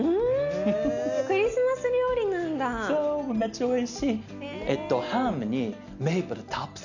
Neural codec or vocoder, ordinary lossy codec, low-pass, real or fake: none; none; 7.2 kHz; real